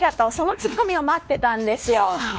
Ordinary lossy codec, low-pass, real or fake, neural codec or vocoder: none; none; fake; codec, 16 kHz, 2 kbps, X-Codec, WavLM features, trained on Multilingual LibriSpeech